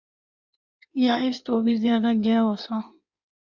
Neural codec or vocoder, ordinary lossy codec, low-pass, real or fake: codec, 16 kHz in and 24 kHz out, 1.1 kbps, FireRedTTS-2 codec; Opus, 64 kbps; 7.2 kHz; fake